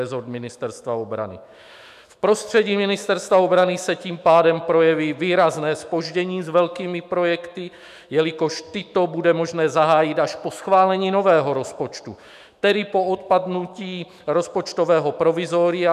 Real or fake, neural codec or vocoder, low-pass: fake; autoencoder, 48 kHz, 128 numbers a frame, DAC-VAE, trained on Japanese speech; 14.4 kHz